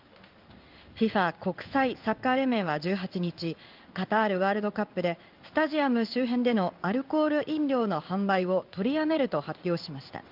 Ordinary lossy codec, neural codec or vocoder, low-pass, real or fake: Opus, 24 kbps; codec, 16 kHz in and 24 kHz out, 1 kbps, XY-Tokenizer; 5.4 kHz; fake